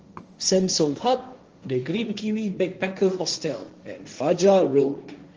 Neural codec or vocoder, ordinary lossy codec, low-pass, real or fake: codec, 16 kHz, 1.1 kbps, Voila-Tokenizer; Opus, 24 kbps; 7.2 kHz; fake